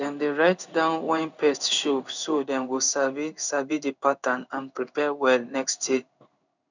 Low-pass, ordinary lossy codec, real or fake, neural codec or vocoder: 7.2 kHz; none; fake; codec, 16 kHz in and 24 kHz out, 1 kbps, XY-Tokenizer